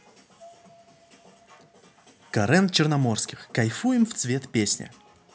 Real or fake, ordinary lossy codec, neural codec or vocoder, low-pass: real; none; none; none